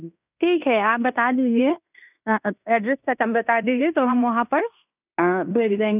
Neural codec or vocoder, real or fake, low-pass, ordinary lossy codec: codec, 16 kHz in and 24 kHz out, 0.9 kbps, LongCat-Audio-Codec, fine tuned four codebook decoder; fake; 3.6 kHz; none